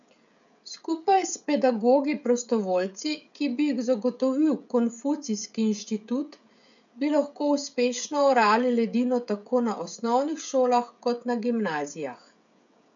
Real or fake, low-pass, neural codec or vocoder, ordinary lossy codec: fake; 7.2 kHz; codec, 16 kHz, 16 kbps, FreqCodec, smaller model; none